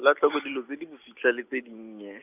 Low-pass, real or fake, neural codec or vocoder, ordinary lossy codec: 3.6 kHz; real; none; none